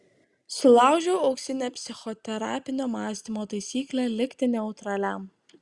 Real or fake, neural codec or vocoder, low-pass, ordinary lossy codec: real; none; 10.8 kHz; Opus, 64 kbps